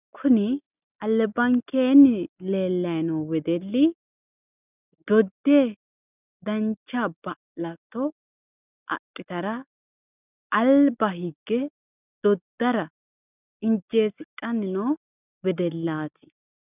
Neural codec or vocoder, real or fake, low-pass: none; real; 3.6 kHz